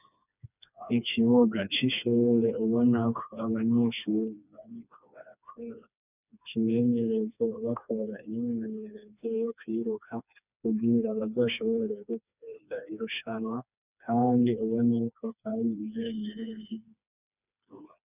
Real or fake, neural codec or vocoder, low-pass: fake; codec, 16 kHz, 2 kbps, FreqCodec, smaller model; 3.6 kHz